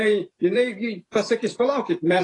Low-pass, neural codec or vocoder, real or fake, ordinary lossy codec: 10.8 kHz; vocoder, 44.1 kHz, 128 mel bands every 512 samples, BigVGAN v2; fake; AAC, 32 kbps